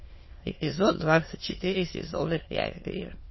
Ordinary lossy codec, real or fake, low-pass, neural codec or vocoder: MP3, 24 kbps; fake; 7.2 kHz; autoencoder, 22.05 kHz, a latent of 192 numbers a frame, VITS, trained on many speakers